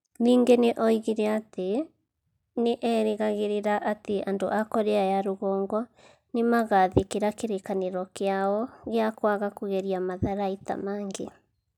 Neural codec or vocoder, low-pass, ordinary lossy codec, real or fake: none; 19.8 kHz; none; real